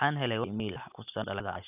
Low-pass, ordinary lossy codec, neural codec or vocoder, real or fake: 3.6 kHz; none; none; real